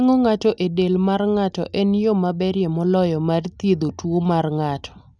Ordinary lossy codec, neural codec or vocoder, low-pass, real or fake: none; none; none; real